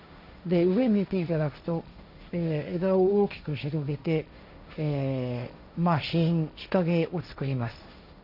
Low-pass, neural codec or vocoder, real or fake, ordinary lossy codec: 5.4 kHz; codec, 16 kHz, 1.1 kbps, Voila-Tokenizer; fake; none